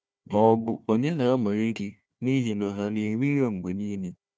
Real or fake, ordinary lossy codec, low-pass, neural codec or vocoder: fake; none; none; codec, 16 kHz, 1 kbps, FunCodec, trained on Chinese and English, 50 frames a second